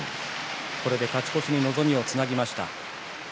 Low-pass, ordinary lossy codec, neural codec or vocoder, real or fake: none; none; none; real